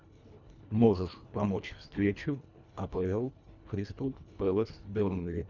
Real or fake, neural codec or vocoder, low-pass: fake; codec, 24 kHz, 1.5 kbps, HILCodec; 7.2 kHz